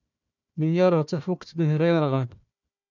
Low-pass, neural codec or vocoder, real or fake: 7.2 kHz; codec, 16 kHz, 1 kbps, FunCodec, trained on Chinese and English, 50 frames a second; fake